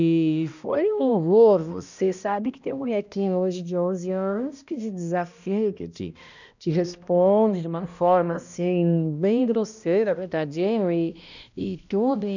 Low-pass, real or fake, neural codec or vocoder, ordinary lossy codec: 7.2 kHz; fake; codec, 16 kHz, 1 kbps, X-Codec, HuBERT features, trained on balanced general audio; none